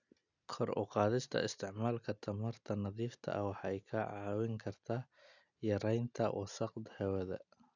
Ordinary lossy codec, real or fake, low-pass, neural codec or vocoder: none; real; 7.2 kHz; none